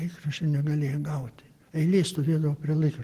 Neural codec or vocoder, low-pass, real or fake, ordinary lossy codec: none; 14.4 kHz; real; Opus, 16 kbps